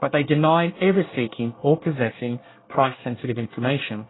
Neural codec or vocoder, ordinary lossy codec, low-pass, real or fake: codec, 24 kHz, 1 kbps, SNAC; AAC, 16 kbps; 7.2 kHz; fake